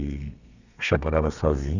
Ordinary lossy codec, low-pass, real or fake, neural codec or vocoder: none; 7.2 kHz; fake; codec, 32 kHz, 1.9 kbps, SNAC